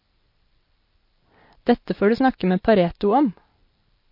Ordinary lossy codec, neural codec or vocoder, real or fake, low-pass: MP3, 32 kbps; none; real; 5.4 kHz